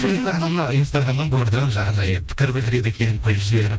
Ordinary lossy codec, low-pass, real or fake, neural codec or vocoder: none; none; fake; codec, 16 kHz, 1 kbps, FreqCodec, smaller model